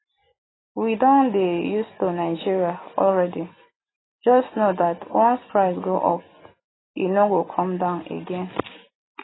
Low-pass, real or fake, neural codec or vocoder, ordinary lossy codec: 7.2 kHz; real; none; AAC, 16 kbps